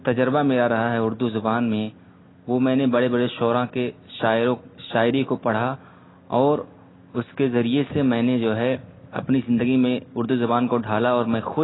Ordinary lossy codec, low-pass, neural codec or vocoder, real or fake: AAC, 16 kbps; 7.2 kHz; none; real